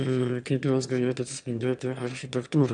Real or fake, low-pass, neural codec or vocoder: fake; 9.9 kHz; autoencoder, 22.05 kHz, a latent of 192 numbers a frame, VITS, trained on one speaker